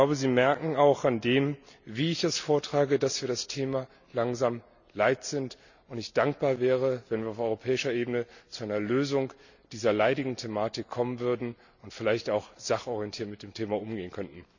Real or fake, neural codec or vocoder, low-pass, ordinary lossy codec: real; none; 7.2 kHz; none